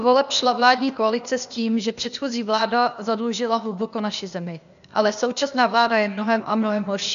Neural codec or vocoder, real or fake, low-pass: codec, 16 kHz, 0.8 kbps, ZipCodec; fake; 7.2 kHz